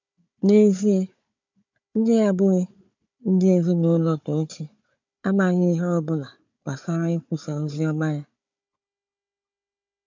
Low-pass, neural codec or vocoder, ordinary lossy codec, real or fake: 7.2 kHz; codec, 16 kHz, 4 kbps, FunCodec, trained on Chinese and English, 50 frames a second; MP3, 64 kbps; fake